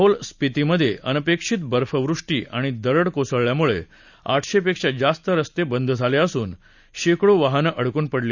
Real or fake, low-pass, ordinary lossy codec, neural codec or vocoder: real; 7.2 kHz; none; none